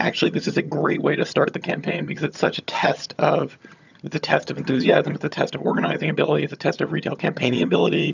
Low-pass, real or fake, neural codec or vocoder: 7.2 kHz; fake; vocoder, 22.05 kHz, 80 mel bands, HiFi-GAN